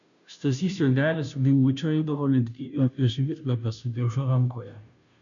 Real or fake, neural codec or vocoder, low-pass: fake; codec, 16 kHz, 0.5 kbps, FunCodec, trained on Chinese and English, 25 frames a second; 7.2 kHz